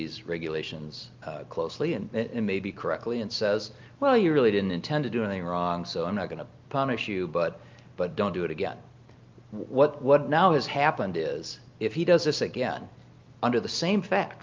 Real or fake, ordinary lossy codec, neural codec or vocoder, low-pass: real; Opus, 24 kbps; none; 7.2 kHz